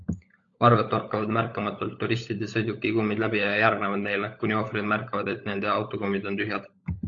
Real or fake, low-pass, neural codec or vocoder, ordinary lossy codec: fake; 7.2 kHz; codec, 16 kHz, 16 kbps, FunCodec, trained on LibriTTS, 50 frames a second; AAC, 48 kbps